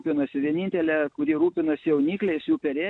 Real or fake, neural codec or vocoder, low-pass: real; none; 9.9 kHz